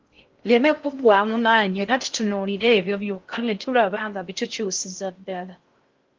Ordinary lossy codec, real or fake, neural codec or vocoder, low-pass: Opus, 24 kbps; fake; codec, 16 kHz in and 24 kHz out, 0.6 kbps, FocalCodec, streaming, 4096 codes; 7.2 kHz